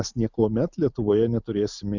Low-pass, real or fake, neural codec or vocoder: 7.2 kHz; real; none